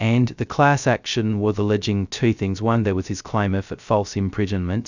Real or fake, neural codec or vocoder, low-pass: fake; codec, 16 kHz, 0.2 kbps, FocalCodec; 7.2 kHz